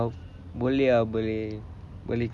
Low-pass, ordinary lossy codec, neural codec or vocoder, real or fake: none; none; none; real